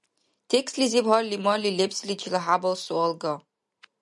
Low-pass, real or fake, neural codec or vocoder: 10.8 kHz; real; none